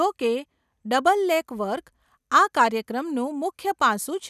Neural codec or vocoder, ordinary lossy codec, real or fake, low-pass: none; none; real; 14.4 kHz